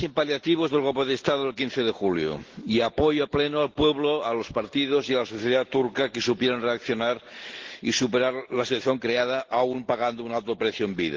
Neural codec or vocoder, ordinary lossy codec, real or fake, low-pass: none; Opus, 16 kbps; real; 7.2 kHz